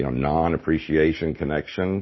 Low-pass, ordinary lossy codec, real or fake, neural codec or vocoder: 7.2 kHz; MP3, 24 kbps; real; none